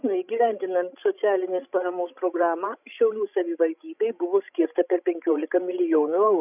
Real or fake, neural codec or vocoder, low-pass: fake; codec, 16 kHz, 16 kbps, FreqCodec, larger model; 3.6 kHz